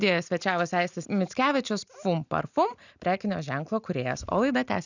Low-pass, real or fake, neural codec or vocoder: 7.2 kHz; real; none